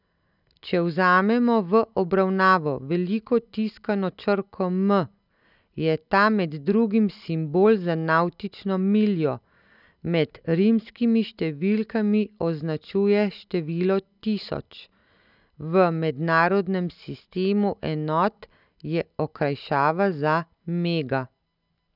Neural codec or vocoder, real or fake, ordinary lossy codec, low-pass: none; real; none; 5.4 kHz